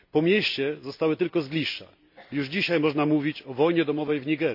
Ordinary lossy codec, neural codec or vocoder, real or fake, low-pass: none; none; real; 5.4 kHz